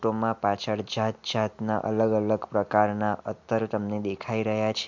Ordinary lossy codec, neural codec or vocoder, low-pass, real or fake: MP3, 64 kbps; none; 7.2 kHz; real